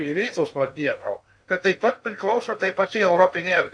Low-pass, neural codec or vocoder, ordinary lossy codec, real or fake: 9.9 kHz; codec, 16 kHz in and 24 kHz out, 0.6 kbps, FocalCodec, streaming, 2048 codes; AAC, 64 kbps; fake